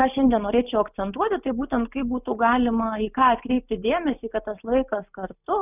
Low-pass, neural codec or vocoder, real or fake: 3.6 kHz; none; real